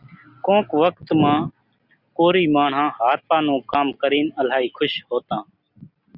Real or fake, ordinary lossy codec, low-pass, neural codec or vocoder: real; Opus, 64 kbps; 5.4 kHz; none